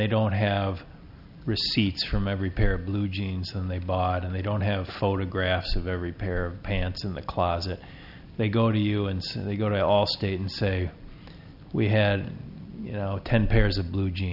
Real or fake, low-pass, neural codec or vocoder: real; 5.4 kHz; none